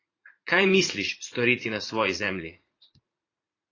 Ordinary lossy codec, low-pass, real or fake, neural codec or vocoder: AAC, 32 kbps; 7.2 kHz; fake; vocoder, 44.1 kHz, 80 mel bands, Vocos